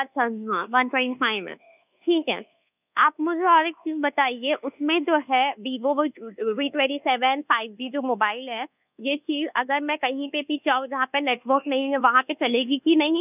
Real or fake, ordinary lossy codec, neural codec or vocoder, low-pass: fake; none; codec, 24 kHz, 1.2 kbps, DualCodec; 3.6 kHz